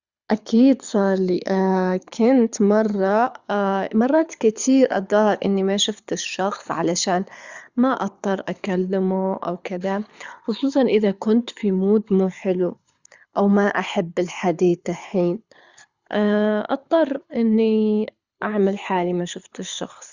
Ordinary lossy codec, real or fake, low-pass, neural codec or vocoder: Opus, 64 kbps; fake; 7.2 kHz; codec, 24 kHz, 6 kbps, HILCodec